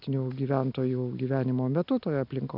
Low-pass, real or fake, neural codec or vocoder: 5.4 kHz; real; none